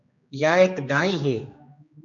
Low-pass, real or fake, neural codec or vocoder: 7.2 kHz; fake; codec, 16 kHz, 2 kbps, X-Codec, HuBERT features, trained on general audio